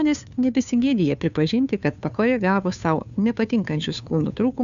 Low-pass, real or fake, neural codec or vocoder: 7.2 kHz; fake; codec, 16 kHz, 2 kbps, FunCodec, trained on Chinese and English, 25 frames a second